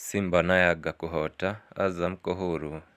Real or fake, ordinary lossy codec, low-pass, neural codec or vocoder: real; Opus, 64 kbps; 14.4 kHz; none